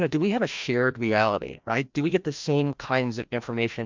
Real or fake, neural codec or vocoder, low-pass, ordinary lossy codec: fake; codec, 16 kHz, 1 kbps, FreqCodec, larger model; 7.2 kHz; MP3, 64 kbps